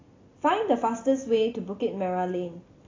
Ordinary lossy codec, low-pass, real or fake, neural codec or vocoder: AAC, 32 kbps; 7.2 kHz; real; none